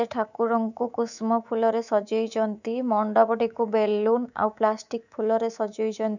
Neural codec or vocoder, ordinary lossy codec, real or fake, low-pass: codec, 24 kHz, 3.1 kbps, DualCodec; none; fake; 7.2 kHz